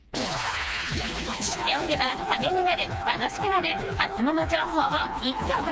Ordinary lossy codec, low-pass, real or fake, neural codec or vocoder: none; none; fake; codec, 16 kHz, 2 kbps, FreqCodec, smaller model